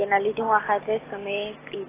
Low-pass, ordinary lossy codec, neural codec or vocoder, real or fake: 3.6 kHz; AAC, 16 kbps; none; real